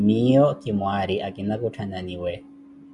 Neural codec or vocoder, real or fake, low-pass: none; real; 10.8 kHz